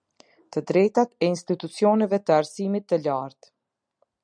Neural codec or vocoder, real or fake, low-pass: none; real; 9.9 kHz